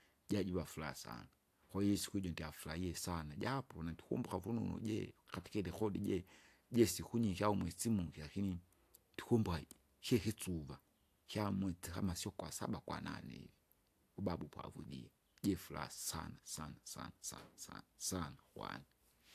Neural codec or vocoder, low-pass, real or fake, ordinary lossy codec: none; 14.4 kHz; real; AAC, 64 kbps